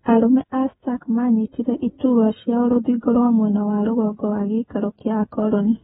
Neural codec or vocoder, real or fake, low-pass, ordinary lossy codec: codec, 16 kHz, 16 kbps, FreqCodec, smaller model; fake; 7.2 kHz; AAC, 16 kbps